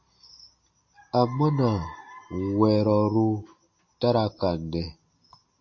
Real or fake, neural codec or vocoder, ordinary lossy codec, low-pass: real; none; MP3, 32 kbps; 7.2 kHz